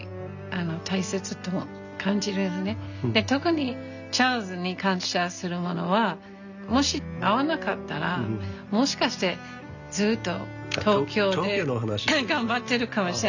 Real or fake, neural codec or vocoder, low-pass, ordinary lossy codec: real; none; 7.2 kHz; none